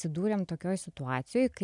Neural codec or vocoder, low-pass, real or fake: none; 10.8 kHz; real